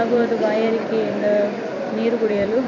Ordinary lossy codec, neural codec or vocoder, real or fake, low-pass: none; vocoder, 44.1 kHz, 128 mel bands every 256 samples, BigVGAN v2; fake; 7.2 kHz